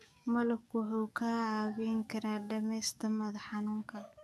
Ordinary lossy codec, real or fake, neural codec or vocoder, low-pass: none; fake; codec, 44.1 kHz, 7.8 kbps, DAC; 14.4 kHz